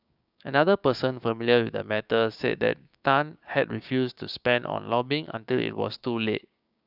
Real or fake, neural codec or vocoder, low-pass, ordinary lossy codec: fake; codec, 16 kHz, 6 kbps, DAC; 5.4 kHz; none